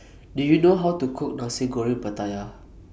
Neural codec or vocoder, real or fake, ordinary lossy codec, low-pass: none; real; none; none